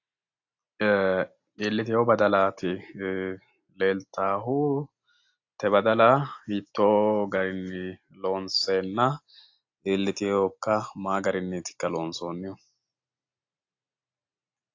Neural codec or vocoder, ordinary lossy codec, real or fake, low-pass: none; AAC, 48 kbps; real; 7.2 kHz